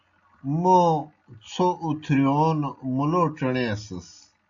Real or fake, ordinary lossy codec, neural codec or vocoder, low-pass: real; AAC, 64 kbps; none; 7.2 kHz